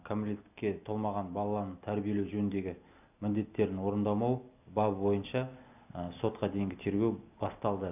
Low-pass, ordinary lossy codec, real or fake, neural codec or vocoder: 3.6 kHz; none; real; none